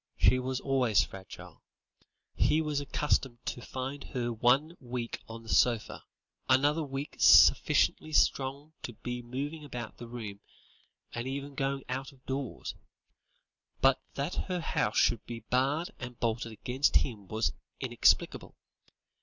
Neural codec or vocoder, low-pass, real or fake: none; 7.2 kHz; real